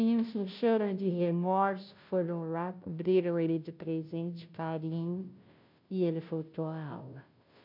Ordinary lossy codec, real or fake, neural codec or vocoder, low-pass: MP3, 48 kbps; fake; codec, 16 kHz, 0.5 kbps, FunCodec, trained on Chinese and English, 25 frames a second; 5.4 kHz